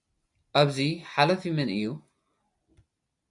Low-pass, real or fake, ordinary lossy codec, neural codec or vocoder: 10.8 kHz; real; MP3, 96 kbps; none